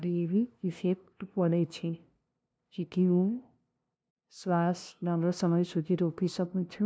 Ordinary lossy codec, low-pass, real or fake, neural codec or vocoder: none; none; fake; codec, 16 kHz, 0.5 kbps, FunCodec, trained on LibriTTS, 25 frames a second